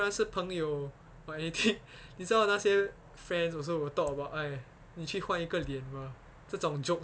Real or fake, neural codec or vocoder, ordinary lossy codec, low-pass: real; none; none; none